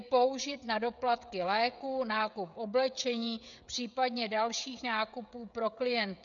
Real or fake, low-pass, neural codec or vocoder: fake; 7.2 kHz; codec, 16 kHz, 16 kbps, FreqCodec, smaller model